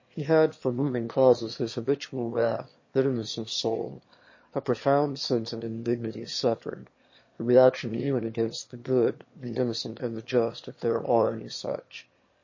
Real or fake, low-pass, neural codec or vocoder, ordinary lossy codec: fake; 7.2 kHz; autoencoder, 22.05 kHz, a latent of 192 numbers a frame, VITS, trained on one speaker; MP3, 32 kbps